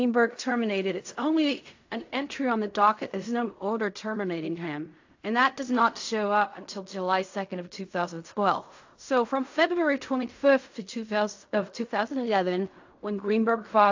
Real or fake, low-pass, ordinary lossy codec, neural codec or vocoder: fake; 7.2 kHz; AAC, 48 kbps; codec, 16 kHz in and 24 kHz out, 0.4 kbps, LongCat-Audio-Codec, fine tuned four codebook decoder